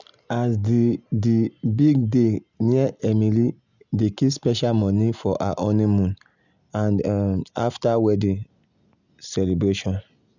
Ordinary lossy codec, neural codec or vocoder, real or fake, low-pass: none; none; real; 7.2 kHz